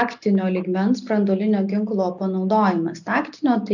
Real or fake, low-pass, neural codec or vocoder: real; 7.2 kHz; none